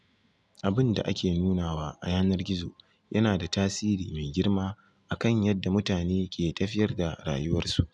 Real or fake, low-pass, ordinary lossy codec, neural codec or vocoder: real; none; none; none